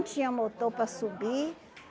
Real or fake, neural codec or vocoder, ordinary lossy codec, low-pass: real; none; none; none